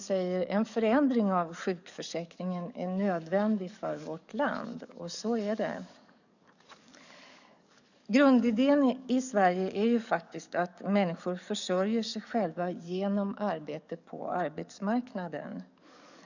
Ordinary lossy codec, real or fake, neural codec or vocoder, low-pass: none; fake; codec, 44.1 kHz, 7.8 kbps, DAC; 7.2 kHz